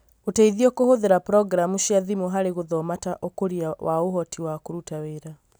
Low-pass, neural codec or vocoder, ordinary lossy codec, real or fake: none; none; none; real